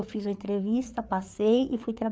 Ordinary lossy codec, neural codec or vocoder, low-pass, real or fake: none; codec, 16 kHz, 4 kbps, FreqCodec, larger model; none; fake